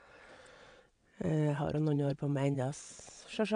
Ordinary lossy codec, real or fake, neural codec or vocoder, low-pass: AAC, 64 kbps; fake; vocoder, 22.05 kHz, 80 mel bands, WaveNeXt; 9.9 kHz